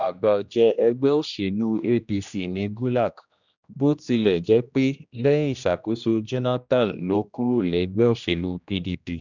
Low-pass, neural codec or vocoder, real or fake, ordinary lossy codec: 7.2 kHz; codec, 16 kHz, 1 kbps, X-Codec, HuBERT features, trained on general audio; fake; none